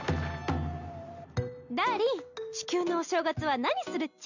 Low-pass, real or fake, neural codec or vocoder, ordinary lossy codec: 7.2 kHz; real; none; none